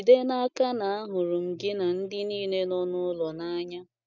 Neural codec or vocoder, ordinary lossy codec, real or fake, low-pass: none; none; real; 7.2 kHz